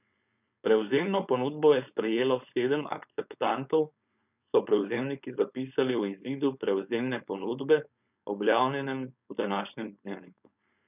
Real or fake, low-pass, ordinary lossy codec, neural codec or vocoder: fake; 3.6 kHz; none; codec, 16 kHz, 4.8 kbps, FACodec